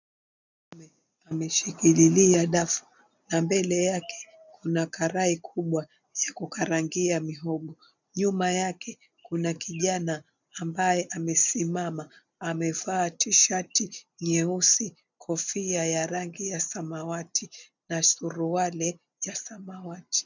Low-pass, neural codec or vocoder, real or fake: 7.2 kHz; none; real